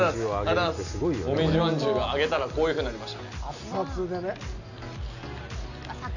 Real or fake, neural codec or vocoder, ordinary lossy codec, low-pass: real; none; none; 7.2 kHz